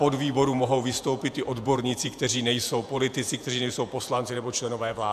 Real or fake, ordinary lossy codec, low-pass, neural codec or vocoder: fake; AAC, 96 kbps; 14.4 kHz; vocoder, 48 kHz, 128 mel bands, Vocos